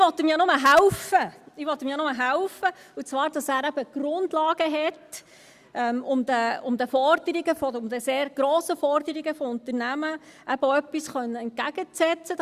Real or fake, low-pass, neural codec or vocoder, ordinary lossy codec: real; 14.4 kHz; none; Opus, 64 kbps